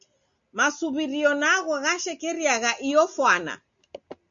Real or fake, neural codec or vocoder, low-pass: real; none; 7.2 kHz